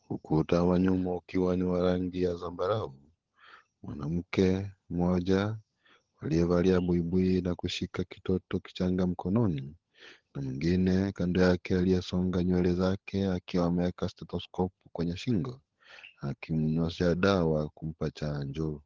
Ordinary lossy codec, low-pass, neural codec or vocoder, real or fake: Opus, 16 kbps; 7.2 kHz; codec, 16 kHz, 16 kbps, FunCodec, trained on LibriTTS, 50 frames a second; fake